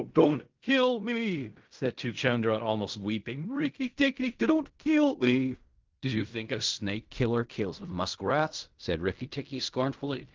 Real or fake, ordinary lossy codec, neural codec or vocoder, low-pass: fake; Opus, 24 kbps; codec, 16 kHz in and 24 kHz out, 0.4 kbps, LongCat-Audio-Codec, fine tuned four codebook decoder; 7.2 kHz